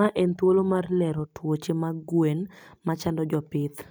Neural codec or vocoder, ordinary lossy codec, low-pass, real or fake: none; none; none; real